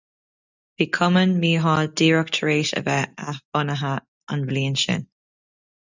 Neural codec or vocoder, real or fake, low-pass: none; real; 7.2 kHz